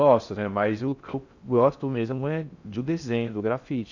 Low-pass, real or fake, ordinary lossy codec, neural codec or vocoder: 7.2 kHz; fake; none; codec, 16 kHz in and 24 kHz out, 0.6 kbps, FocalCodec, streaming, 2048 codes